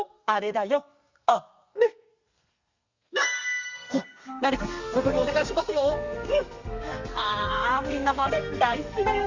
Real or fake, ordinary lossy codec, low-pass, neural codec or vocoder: fake; Opus, 64 kbps; 7.2 kHz; codec, 32 kHz, 1.9 kbps, SNAC